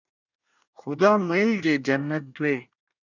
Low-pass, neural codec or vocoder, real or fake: 7.2 kHz; codec, 24 kHz, 1 kbps, SNAC; fake